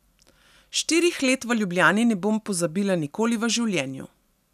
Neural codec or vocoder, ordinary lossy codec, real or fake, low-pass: none; none; real; 14.4 kHz